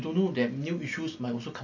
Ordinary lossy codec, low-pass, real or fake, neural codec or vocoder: none; 7.2 kHz; real; none